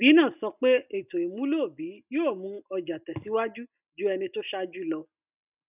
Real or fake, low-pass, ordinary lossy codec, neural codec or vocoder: real; 3.6 kHz; none; none